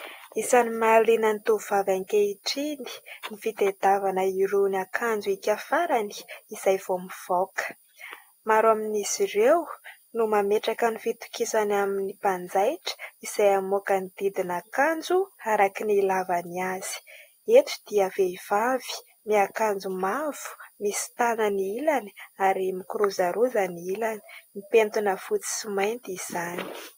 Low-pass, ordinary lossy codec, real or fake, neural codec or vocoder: 19.8 kHz; AAC, 48 kbps; real; none